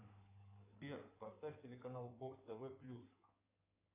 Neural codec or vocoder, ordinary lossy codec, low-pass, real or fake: codec, 16 kHz in and 24 kHz out, 2.2 kbps, FireRedTTS-2 codec; AAC, 32 kbps; 3.6 kHz; fake